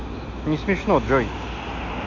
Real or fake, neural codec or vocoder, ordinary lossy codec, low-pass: real; none; MP3, 64 kbps; 7.2 kHz